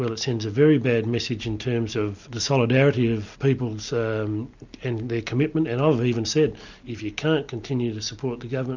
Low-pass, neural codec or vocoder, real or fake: 7.2 kHz; none; real